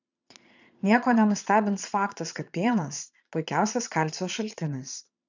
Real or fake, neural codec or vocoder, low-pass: fake; vocoder, 22.05 kHz, 80 mel bands, Vocos; 7.2 kHz